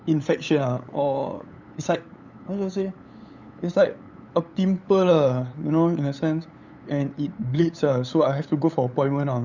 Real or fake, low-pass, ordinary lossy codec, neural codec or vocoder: fake; 7.2 kHz; none; codec, 16 kHz, 8 kbps, FunCodec, trained on LibriTTS, 25 frames a second